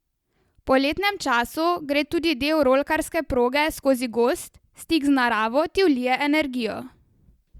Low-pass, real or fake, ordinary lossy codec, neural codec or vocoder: 19.8 kHz; real; Opus, 64 kbps; none